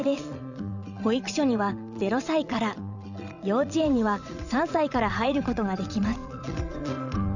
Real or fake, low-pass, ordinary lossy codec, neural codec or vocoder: fake; 7.2 kHz; none; vocoder, 22.05 kHz, 80 mel bands, WaveNeXt